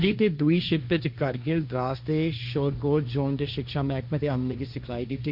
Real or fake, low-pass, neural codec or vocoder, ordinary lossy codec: fake; 5.4 kHz; codec, 16 kHz, 1.1 kbps, Voila-Tokenizer; none